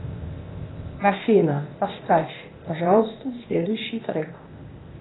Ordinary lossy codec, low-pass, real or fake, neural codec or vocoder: AAC, 16 kbps; 7.2 kHz; fake; codec, 16 kHz, 0.8 kbps, ZipCodec